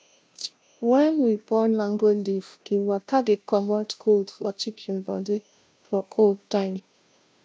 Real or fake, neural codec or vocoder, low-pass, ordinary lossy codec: fake; codec, 16 kHz, 0.5 kbps, FunCodec, trained on Chinese and English, 25 frames a second; none; none